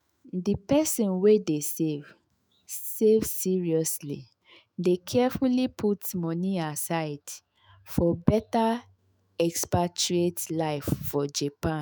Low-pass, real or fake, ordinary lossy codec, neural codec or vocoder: none; fake; none; autoencoder, 48 kHz, 128 numbers a frame, DAC-VAE, trained on Japanese speech